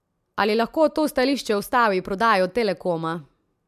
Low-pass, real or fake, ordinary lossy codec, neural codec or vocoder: 14.4 kHz; real; MP3, 96 kbps; none